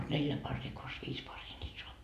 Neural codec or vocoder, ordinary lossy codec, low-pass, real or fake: none; none; 14.4 kHz; real